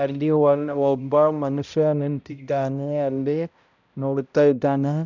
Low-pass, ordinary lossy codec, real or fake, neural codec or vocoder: 7.2 kHz; none; fake; codec, 16 kHz, 0.5 kbps, X-Codec, HuBERT features, trained on balanced general audio